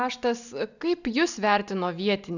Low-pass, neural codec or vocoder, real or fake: 7.2 kHz; none; real